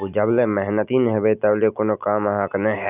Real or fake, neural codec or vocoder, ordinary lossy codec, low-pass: real; none; none; 3.6 kHz